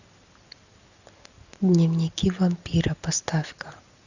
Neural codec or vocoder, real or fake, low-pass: none; real; 7.2 kHz